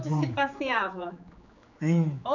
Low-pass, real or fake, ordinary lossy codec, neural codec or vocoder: 7.2 kHz; fake; none; codec, 16 kHz, 4 kbps, X-Codec, HuBERT features, trained on general audio